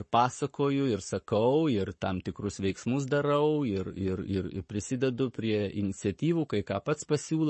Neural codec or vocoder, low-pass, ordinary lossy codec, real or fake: codec, 44.1 kHz, 7.8 kbps, Pupu-Codec; 10.8 kHz; MP3, 32 kbps; fake